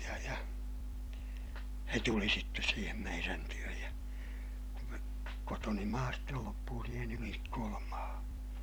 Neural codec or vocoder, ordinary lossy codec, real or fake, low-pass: vocoder, 44.1 kHz, 128 mel bands every 256 samples, BigVGAN v2; none; fake; none